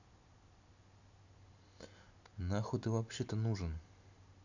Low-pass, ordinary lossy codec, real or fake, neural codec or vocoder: 7.2 kHz; none; real; none